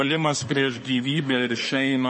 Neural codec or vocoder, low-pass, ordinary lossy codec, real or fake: codec, 24 kHz, 1 kbps, SNAC; 10.8 kHz; MP3, 32 kbps; fake